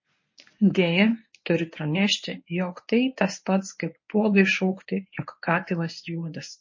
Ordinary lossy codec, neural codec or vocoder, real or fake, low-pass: MP3, 32 kbps; codec, 24 kHz, 0.9 kbps, WavTokenizer, medium speech release version 1; fake; 7.2 kHz